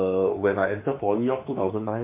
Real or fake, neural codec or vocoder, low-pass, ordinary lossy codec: fake; autoencoder, 48 kHz, 32 numbers a frame, DAC-VAE, trained on Japanese speech; 3.6 kHz; MP3, 32 kbps